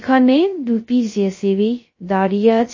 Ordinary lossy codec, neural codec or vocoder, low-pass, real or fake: MP3, 32 kbps; codec, 16 kHz, 0.2 kbps, FocalCodec; 7.2 kHz; fake